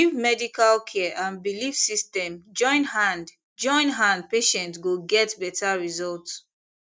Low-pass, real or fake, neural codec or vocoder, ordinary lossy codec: none; real; none; none